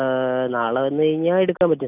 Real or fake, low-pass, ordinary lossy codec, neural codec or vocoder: real; 3.6 kHz; none; none